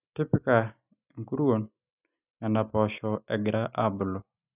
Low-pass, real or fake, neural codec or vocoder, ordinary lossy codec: 3.6 kHz; real; none; none